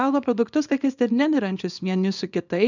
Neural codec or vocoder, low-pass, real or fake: codec, 24 kHz, 0.9 kbps, WavTokenizer, small release; 7.2 kHz; fake